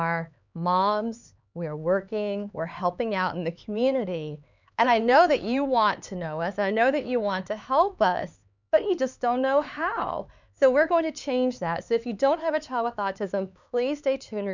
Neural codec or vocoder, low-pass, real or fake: codec, 16 kHz, 4 kbps, X-Codec, HuBERT features, trained on LibriSpeech; 7.2 kHz; fake